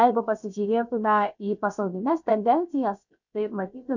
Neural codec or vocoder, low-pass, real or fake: codec, 16 kHz, 0.7 kbps, FocalCodec; 7.2 kHz; fake